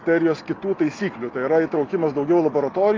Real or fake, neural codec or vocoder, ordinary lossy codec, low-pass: real; none; Opus, 24 kbps; 7.2 kHz